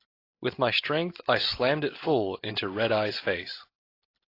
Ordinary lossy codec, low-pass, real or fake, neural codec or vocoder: AAC, 32 kbps; 5.4 kHz; real; none